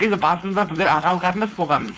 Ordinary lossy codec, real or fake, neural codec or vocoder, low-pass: none; fake; codec, 16 kHz, 4.8 kbps, FACodec; none